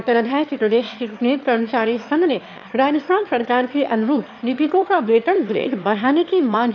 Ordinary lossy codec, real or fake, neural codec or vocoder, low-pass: AAC, 48 kbps; fake; autoencoder, 22.05 kHz, a latent of 192 numbers a frame, VITS, trained on one speaker; 7.2 kHz